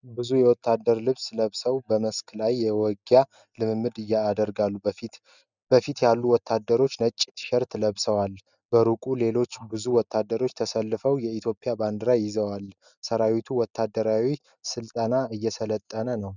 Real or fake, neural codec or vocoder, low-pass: real; none; 7.2 kHz